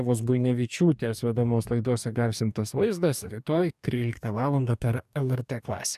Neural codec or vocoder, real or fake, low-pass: codec, 44.1 kHz, 2.6 kbps, DAC; fake; 14.4 kHz